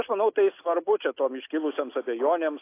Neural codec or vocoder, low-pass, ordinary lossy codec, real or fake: none; 3.6 kHz; AAC, 24 kbps; real